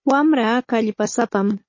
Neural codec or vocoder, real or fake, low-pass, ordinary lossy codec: codec, 16 kHz, 16 kbps, FunCodec, trained on Chinese and English, 50 frames a second; fake; 7.2 kHz; MP3, 32 kbps